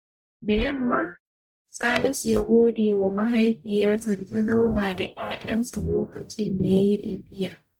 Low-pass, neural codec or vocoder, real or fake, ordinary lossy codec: 19.8 kHz; codec, 44.1 kHz, 0.9 kbps, DAC; fake; none